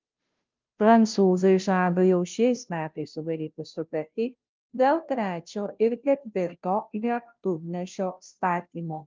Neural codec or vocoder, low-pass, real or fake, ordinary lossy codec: codec, 16 kHz, 0.5 kbps, FunCodec, trained on Chinese and English, 25 frames a second; 7.2 kHz; fake; Opus, 24 kbps